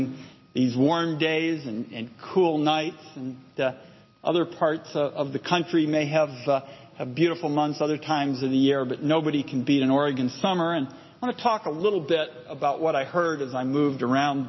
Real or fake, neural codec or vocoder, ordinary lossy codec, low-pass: real; none; MP3, 24 kbps; 7.2 kHz